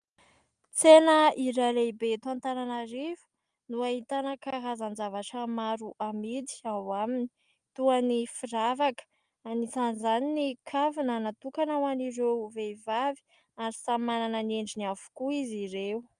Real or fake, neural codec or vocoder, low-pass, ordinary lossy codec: real; none; 9.9 kHz; Opus, 32 kbps